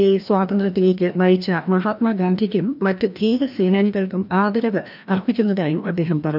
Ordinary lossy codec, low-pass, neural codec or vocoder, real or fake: none; 5.4 kHz; codec, 16 kHz, 1 kbps, FreqCodec, larger model; fake